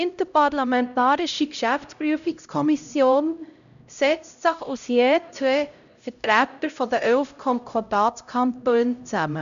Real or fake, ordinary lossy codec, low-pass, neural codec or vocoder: fake; none; 7.2 kHz; codec, 16 kHz, 0.5 kbps, X-Codec, HuBERT features, trained on LibriSpeech